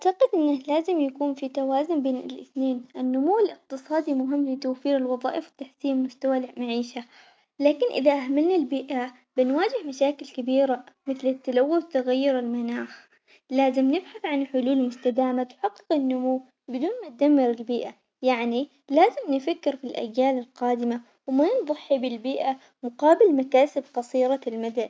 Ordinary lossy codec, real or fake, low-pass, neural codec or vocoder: none; real; none; none